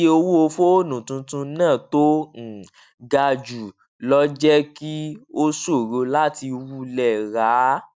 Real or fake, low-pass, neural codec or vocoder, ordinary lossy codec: real; none; none; none